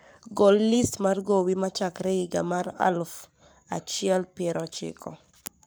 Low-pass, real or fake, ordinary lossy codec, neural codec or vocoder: none; fake; none; codec, 44.1 kHz, 7.8 kbps, Pupu-Codec